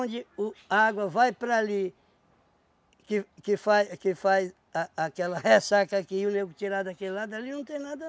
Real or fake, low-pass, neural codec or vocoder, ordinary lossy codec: real; none; none; none